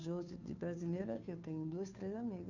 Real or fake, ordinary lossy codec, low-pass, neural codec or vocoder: fake; none; 7.2 kHz; codec, 16 kHz, 2 kbps, FunCodec, trained on Chinese and English, 25 frames a second